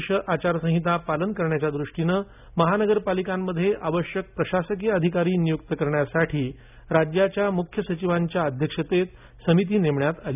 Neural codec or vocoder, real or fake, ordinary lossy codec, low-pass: none; real; none; 3.6 kHz